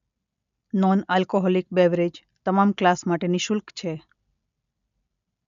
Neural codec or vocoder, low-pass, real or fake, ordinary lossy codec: none; 7.2 kHz; real; none